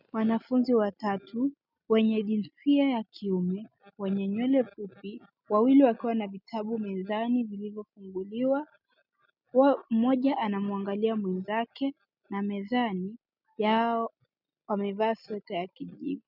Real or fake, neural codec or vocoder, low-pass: real; none; 5.4 kHz